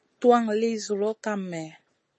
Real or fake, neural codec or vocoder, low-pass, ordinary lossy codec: real; none; 10.8 kHz; MP3, 32 kbps